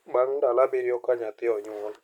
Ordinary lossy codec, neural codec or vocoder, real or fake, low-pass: none; none; real; 19.8 kHz